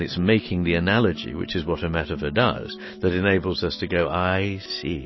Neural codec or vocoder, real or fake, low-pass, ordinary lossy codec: none; real; 7.2 kHz; MP3, 24 kbps